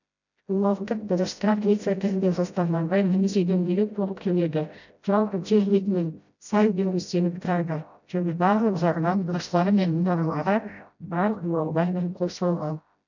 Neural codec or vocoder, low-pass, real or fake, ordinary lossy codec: codec, 16 kHz, 0.5 kbps, FreqCodec, smaller model; 7.2 kHz; fake; none